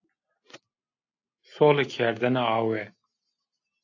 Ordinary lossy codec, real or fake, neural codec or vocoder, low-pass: AAC, 48 kbps; real; none; 7.2 kHz